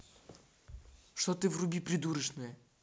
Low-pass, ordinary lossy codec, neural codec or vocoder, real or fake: none; none; none; real